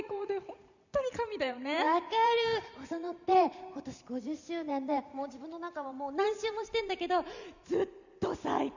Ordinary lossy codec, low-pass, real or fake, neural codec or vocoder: none; 7.2 kHz; fake; vocoder, 44.1 kHz, 128 mel bands every 512 samples, BigVGAN v2